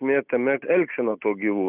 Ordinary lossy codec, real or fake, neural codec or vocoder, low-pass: Opus, 64 kbps; real; none; 3.6 kHz